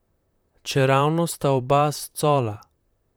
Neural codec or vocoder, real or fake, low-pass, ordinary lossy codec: vocoder, 44.1 kHz, 128 mel bands, Pupu-Vocoder; fake; none; none